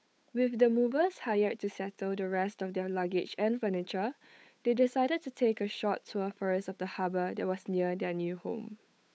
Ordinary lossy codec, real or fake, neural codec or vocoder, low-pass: none; fake; codec, 16 kHz, 8 kbps, FunCodec, trained on Chinese and English, 25 frames a second; none